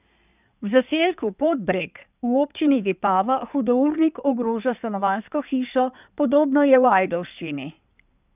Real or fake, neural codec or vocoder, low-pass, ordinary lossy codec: fake; codec, 16 kHz in and 24 kHz out, 2.2 kbps, FireRedTTS-2 codec; 3.6 kHz; none